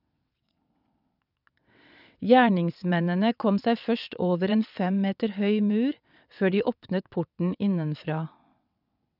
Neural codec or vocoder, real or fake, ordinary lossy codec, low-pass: vocoder, 22.05 kHz, 80 mel bands, WaveNeXt; fake; none; 5.4 kHz